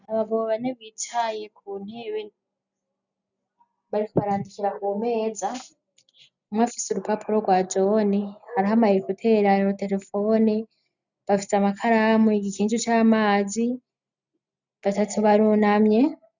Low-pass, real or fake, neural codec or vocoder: 7.2 kHz; real; none